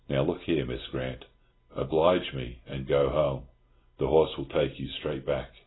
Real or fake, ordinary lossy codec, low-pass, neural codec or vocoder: real; AAC, 16 kbps; 7.2 kHz; none